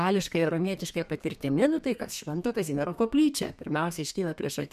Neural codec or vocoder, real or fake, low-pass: codec, 32 kHz, 1.9 kbps, SNAC; fake; 14.4 kHz